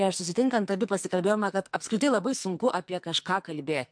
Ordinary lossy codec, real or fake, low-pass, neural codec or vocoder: MP3, 64 kbps; fake; 9.9 kHz; codec, 44.1 kHz, 2.6 kbps, SNAC